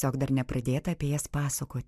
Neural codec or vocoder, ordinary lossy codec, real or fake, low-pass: none; MP3, 96 kbps; real; 14.4 kHz